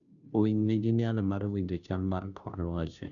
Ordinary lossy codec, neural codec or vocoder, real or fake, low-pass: none; codec, 16 kHz, 0.5 kbps, FunCodec, trained on Chinese and English, 25 frames a second; fake; 7.2 kHz